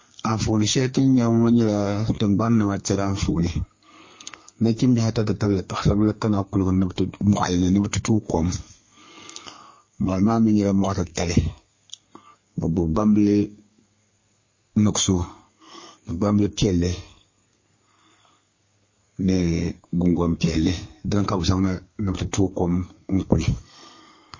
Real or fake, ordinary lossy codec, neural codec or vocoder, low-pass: fake; MP3, 32 kbps; codec, 32 kHz, 1.9 kbps, SNAC; 7.2 kHz